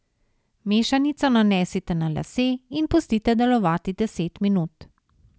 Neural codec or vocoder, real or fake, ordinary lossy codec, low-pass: none; real; none; none